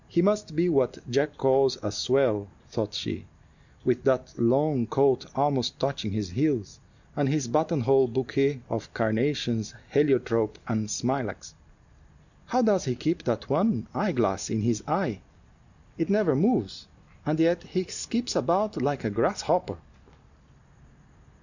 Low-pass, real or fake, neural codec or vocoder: 7.2 kHz; real; none